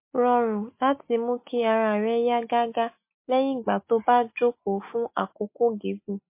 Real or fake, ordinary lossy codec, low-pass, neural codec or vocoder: real; MP3, 24 kbps; 3.6 kHz; none